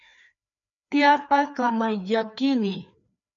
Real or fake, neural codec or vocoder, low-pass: fake; codec, 16 kHz, 2 kbps, FreqCodec, larger model; 7.2 kHz